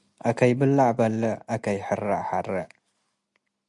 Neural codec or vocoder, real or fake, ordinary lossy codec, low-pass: none; real; Opus, 64 kbps; 10.8 kHz